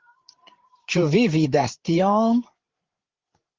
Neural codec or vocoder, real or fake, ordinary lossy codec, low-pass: codec, 16 kHz, 8 kbps, FreqCodec, larger model; fake; Opus, 16 kbps; 7.2 kHz